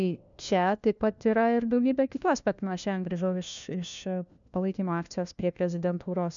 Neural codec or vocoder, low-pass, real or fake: codec, 16 kHz, 1 kbps, FunCodec, trained on LibriTTS, 50 frames a second; 7.2 kHz; fake